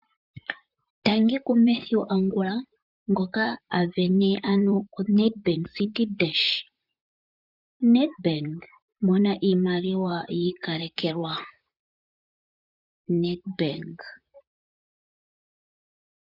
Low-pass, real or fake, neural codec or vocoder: 5.4 kHz; fake; vocoder, 44.1 kHz, 128 mel bands, Pupu-Vocoder